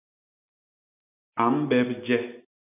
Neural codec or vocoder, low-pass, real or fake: none; 3.6 kHz; real